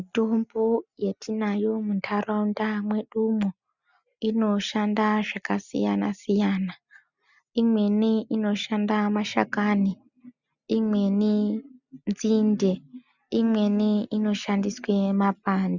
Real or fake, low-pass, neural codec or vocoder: real; 7.2 kHz; none